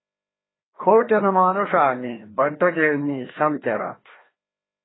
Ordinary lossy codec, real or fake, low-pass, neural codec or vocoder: AAC, 16 kbps; fake; 7.2 kHz; codec, 16 kHz, 1 kbps, FreqCodec, larger model